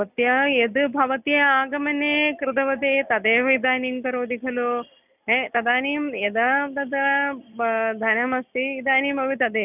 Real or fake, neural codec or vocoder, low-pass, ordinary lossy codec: real; none; 3.6 kHz; none